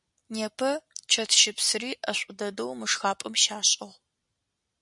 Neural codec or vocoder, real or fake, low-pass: none; real; 10.8 kHz